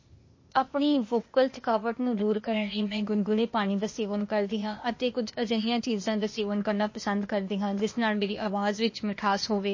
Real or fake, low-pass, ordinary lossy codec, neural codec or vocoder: fake; 7.2 kHz; MP3, 32 kbps; codec, 16 kHz, 0.8 kbps, ZipCodec